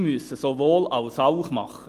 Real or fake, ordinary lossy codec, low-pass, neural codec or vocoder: real; Opus, 24 kbps; 14.4 kHz; none